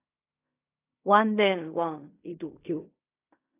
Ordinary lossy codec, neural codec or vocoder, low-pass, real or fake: AAC, 24 kbps; codec, 16 kHz in and 24 kHz out, 0.4 kbps, LongCat-Audio-Codec, fine tuned four codebook decoder; 3.6 kHz; fake